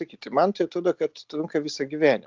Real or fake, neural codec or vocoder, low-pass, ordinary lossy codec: real; none; 7.2 kHz; Opus, 24 kbps